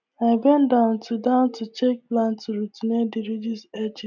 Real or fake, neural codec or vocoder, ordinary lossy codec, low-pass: real; none; none; 7.2 kHz